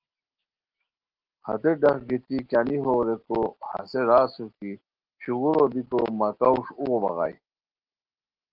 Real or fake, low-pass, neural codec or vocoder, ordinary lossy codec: real; 5.4 kHz; none; Opus, 16 kbps